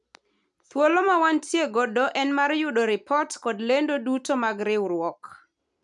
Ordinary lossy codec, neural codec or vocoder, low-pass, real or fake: none; none; 10.8 kHz; real